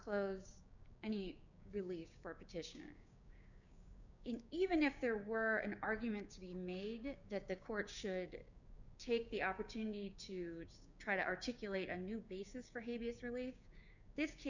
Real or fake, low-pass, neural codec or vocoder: fake; 7.2 kHz; codec, 16 kHz, 6 kbps, DAC